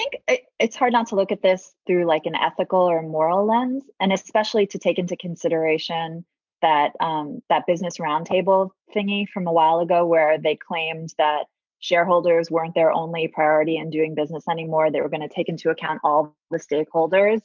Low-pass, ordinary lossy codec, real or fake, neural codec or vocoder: 7.2 kHz; MP3, 64 kbps; real; none